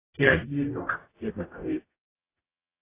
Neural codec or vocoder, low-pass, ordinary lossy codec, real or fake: codec, 44.1 kHz, 0.9 kbps, DAC; 3.6 kHz; AAC, 24 kbps; fake